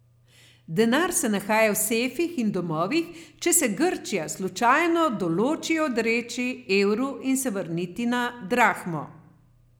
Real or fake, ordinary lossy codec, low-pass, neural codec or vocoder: real; none; none; none